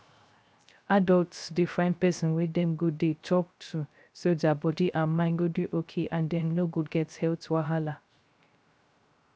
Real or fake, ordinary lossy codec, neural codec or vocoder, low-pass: fake; none; codec, 16 kHz, 0.3 kbps, FocalCodec; none